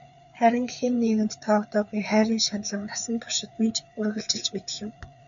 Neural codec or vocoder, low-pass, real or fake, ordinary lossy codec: codec, 16 kHz, 4 kbps, FreqCodec, larger model; 7.2 kHz; fake; MP3, 96 kbps